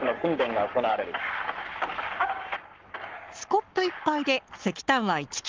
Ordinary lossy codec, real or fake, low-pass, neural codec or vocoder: Opus, 32 kbps; fake; 7.2 kHz; codec, 44.1 kHz, 7.8 kbps, Pupu-Codec